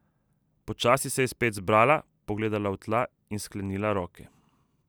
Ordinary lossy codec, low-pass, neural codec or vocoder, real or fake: none; none; none; real